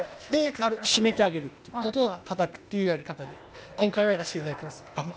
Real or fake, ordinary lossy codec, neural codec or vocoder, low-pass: fake; none; codec, 16 kHz, 0.8 kbps, ZipCodec; none